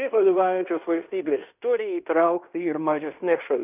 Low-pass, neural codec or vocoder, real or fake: 3.6 kHz; codec, 16 kHz in and 24 kHz out, 0.9 kbps, LongCat-Audio-Codec, fine tuned four codebook decoder; fake